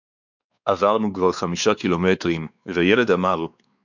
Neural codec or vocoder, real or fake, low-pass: codec, 16 kHz, 4 kbps, X-Codec, WavLM features, trained on Multilingual LibriSpeech; fake; 7.2 kHz